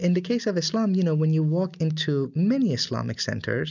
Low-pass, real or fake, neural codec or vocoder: 7.2 kHz; real; none